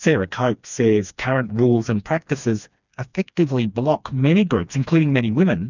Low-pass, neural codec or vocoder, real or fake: 7.2 kHz; codec, 16 kHz, 2 kbps, FreqCodec, smaller model; fake